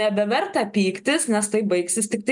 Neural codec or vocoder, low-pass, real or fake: none; 10.8 kHz; real